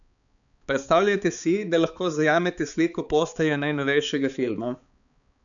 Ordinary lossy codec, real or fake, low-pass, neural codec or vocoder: none; fake; 7.2 kHz; codec, 16 kHz, 4 kbps, X-Codec, HuBERT features, trained on balanced general audio